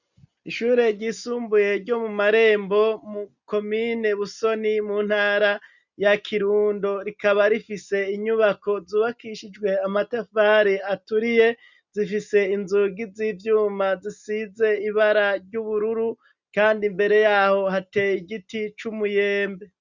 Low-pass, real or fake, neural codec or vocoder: 7.2 kHz; real; none